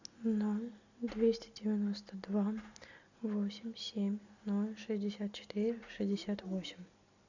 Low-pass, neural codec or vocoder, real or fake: 7.2 kHz; none; real